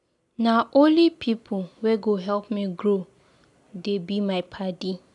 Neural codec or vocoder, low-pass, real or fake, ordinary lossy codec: none; 10.8 kHz; real; none